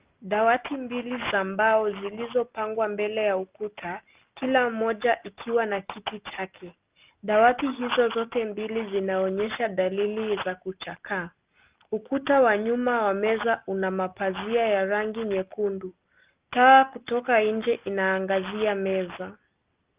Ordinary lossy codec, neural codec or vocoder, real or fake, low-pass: Opus, 24 kbps; none; real; 3.6 kHz